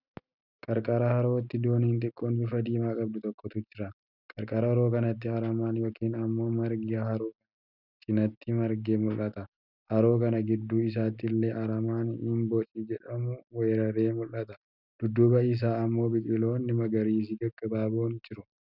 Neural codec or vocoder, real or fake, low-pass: none; real; 5.4 kHz